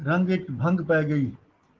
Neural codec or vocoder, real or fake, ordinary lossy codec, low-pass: none; real; Opus, 16 kbps; 7.2 kHz